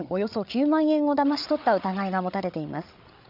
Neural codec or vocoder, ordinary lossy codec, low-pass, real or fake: codec, 16 kHz, 8 kbps, FreqCodec, larger model; none; 5.4 kHz; fake